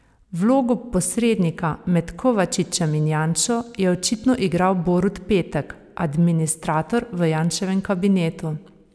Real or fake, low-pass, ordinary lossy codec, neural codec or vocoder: real; none; none; none